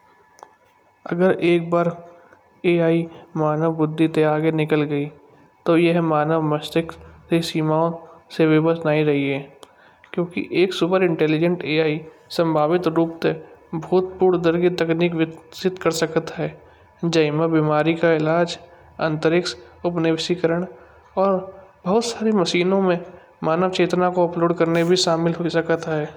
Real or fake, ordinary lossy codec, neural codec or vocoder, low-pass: real; none; none; 19.8 kHz